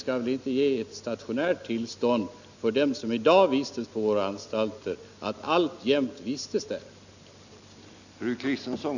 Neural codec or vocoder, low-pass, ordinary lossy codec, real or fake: none; 7.2 kHz; none; real